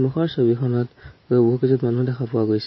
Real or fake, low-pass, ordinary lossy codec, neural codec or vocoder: fake; 7.2 kHz; MP3, 24 kbps; vocoder, 44.1 kHz, 128 mel bands every 512 samples, BigVGAN v2